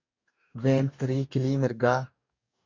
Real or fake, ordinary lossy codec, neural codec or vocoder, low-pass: fake; MP3, 64 kbps; codec, 44.1 kHz, 2.6 kbps, DAC; 7.2 kHz